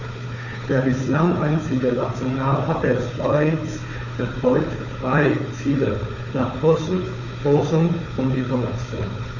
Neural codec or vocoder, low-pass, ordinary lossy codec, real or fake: codec, 16 kHz, 4 kbps, FunCodec, trained on Chinese and English, 50 frames a second; 7.2 kHz; none; fake